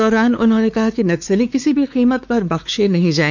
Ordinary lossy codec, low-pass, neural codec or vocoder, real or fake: none; none; codec, 16 kHz, 4 kbps, X-Codec, WavLM features, trained on Multilingual LibriSpeech; fake